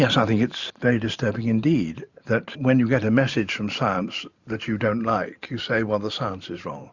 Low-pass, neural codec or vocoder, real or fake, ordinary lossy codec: 7.2 kHz; vocoder, 44.1 kHz, 128 mel bands every 512 samples, BigVGAN v2; fake; Opus, 64 kbps